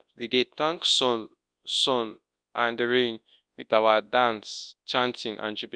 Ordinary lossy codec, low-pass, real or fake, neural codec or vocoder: Opus, 64 kbps; 9.9 kHz; fake; codec, 24 kHz, 0.9 kbps, WavTokenizer, large speech release